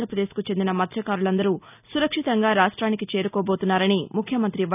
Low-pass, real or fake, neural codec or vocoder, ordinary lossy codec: 3.6 kHz; real; none; none